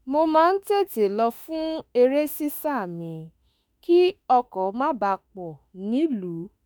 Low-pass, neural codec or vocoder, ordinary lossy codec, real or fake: none; autoencoder, 48 kHz, 32 numbers a frame, DAC-VAE, trained on Japanese speech; none; fake